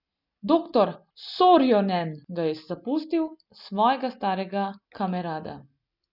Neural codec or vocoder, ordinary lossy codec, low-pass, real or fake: none; none; 5.4 kHz; real